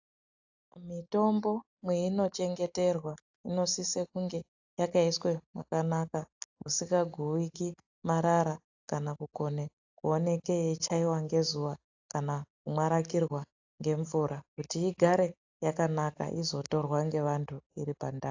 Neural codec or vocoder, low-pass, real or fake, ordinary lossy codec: none; 7.2 kHz; real; AAC, 48 kbps